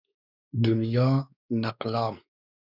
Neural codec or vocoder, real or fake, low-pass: codec, 16 kHz, 2 kbps, X-Codec, WavLM features, trained on Multilingual LibriSpeech; fake; 5.4 kHz